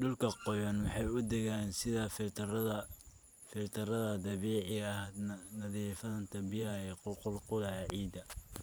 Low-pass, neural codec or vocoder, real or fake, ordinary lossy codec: none; vocoder, 44.1 kHz, 128 mel bands every 512 samples, BigVGAN v2; fake; none